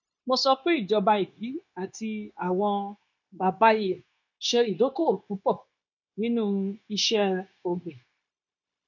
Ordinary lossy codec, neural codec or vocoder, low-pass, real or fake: none; codec, 16 kHz, 0.9 kbps, LongCat-Audio-Codec; 7.2 kHz; fake